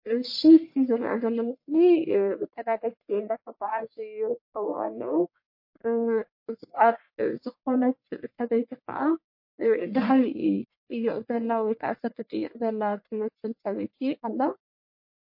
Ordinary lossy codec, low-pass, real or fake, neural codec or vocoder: MP3, 32 kbps; 5.4 kHz; fake; codec, 44.1 kHz, 1.7 kbps, Pupu-Codec